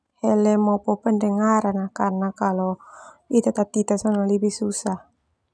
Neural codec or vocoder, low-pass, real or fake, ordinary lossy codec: none; none; real; none